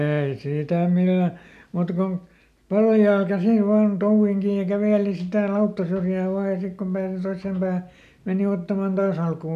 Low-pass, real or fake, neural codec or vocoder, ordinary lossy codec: 14.4 kHz; real; none; none